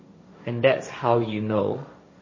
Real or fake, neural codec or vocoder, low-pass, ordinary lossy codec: fake; codec, 16 kHz, 1.1 kbps, Voila-Tokenizer; 7.2 kHz; MP3, 32 kbps